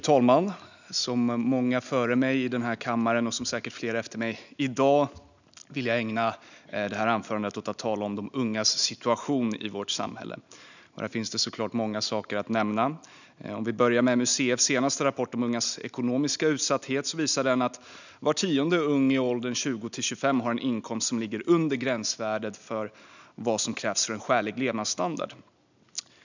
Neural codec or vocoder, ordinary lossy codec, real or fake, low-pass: none; none; real; 7.2 kHz